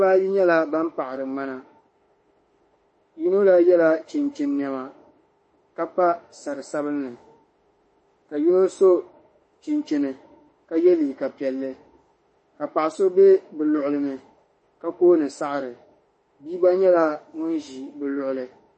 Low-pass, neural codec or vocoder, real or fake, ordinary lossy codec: 9.9 kHz; autoencoder, 48 kHz, 32 numbers a frame, DAC-VAE, trained on Japanese speech; fake; MP3, 32 kbps